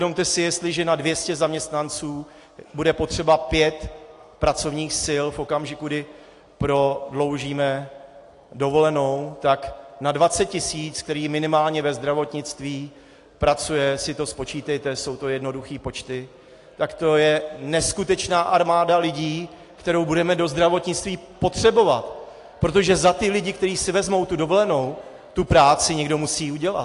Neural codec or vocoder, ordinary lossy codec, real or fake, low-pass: none; AAC, 48 kbps; real; 10.8 kHz